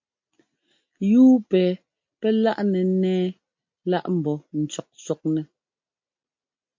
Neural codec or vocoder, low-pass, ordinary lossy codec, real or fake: none; 7.2 kHz; MP3, 48 kbps; real